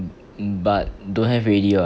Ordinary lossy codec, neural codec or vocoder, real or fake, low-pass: none; none; real; none